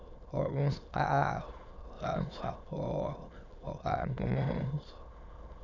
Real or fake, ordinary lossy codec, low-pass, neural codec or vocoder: fake; none; 7.2 kHz; autoencoder, 22.05 kHz, a latent of 192 numbers a frame, VITS, trained on many speakers